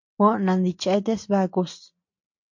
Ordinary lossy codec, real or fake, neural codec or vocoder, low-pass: MP3, 48 kbps; real; none; 7.2 kHz